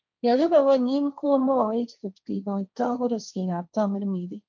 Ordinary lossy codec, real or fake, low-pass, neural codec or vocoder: MP3, 64 kbps; fake; 7.2 kHz; codec, 16 kHz, 1.1 kbps, Voila-Tokenizer